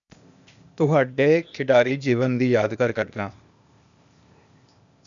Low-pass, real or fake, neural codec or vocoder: 7.2 kHz; fake; codec, 16 kHz, 0.8 kbps, ZipCodec